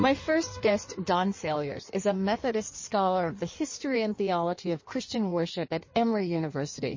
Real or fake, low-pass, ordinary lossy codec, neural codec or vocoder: fake; 7.2 kHz; MP3, 32 kbps; codec, 16 kHz in and 24 kHz out, 1.1 kbps, FireRedTTS-2 codec